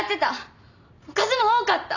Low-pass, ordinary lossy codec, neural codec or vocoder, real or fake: 7.2 kHz; none; none; real